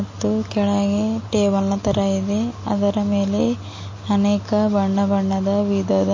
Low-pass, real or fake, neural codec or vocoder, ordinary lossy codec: 7.2 kHz; real; none; MP3, 32 kbps